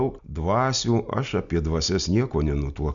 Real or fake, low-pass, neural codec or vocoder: real; 7.2 kHz; none